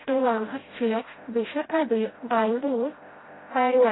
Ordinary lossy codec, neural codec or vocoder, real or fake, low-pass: AAC, 16 kbps; codec, 16 kHz, 0.5 kbps, FreqCodec, smaller model; fake; 7.2 kHz